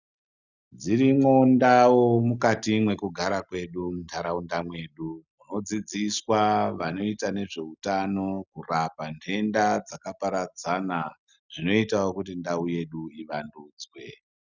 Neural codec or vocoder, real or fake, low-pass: none; real; 7.2 kHz